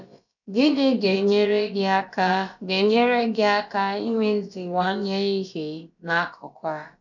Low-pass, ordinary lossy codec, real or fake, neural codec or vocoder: 7.2 kHz; none; fake; codec, 16 kHz, about 1 kbps, DyCAST, with the encoder's durations